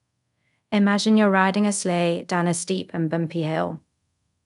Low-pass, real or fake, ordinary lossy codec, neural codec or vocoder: 10.8 kHz; fake; none; codec, 24 kHz, 0.5 kbps, DualCodec